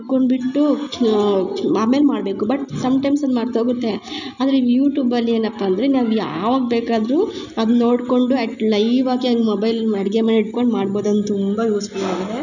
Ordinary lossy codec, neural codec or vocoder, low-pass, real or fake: none; none; 7.2 kHz; real